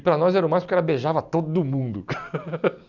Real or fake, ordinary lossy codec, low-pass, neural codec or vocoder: real; none; 7.2 kHz; none